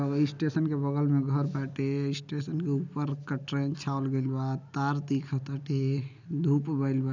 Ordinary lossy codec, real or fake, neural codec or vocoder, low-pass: none; real; none; 7.2 kHz